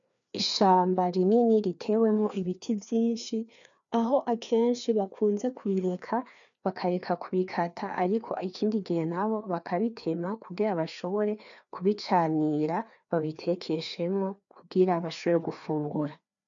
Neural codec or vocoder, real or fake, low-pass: codec, 16 kHz, 2 kbps, FreqCodec, larger model; fake; 7.2 kHz